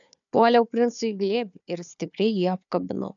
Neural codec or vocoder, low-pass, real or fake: codec, 16 kHz, 4 kbps, FunCodec, trained on Chinese and English, 50 frames a second; 7.2 kHz; fake